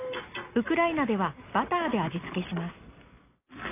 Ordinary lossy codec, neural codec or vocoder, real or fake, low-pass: AAC, 24 kbps; none; real; 3.6 kHz